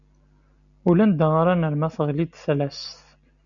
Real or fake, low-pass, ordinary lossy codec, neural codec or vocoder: real; 7.2 kHz; MP3, 96 kbps; none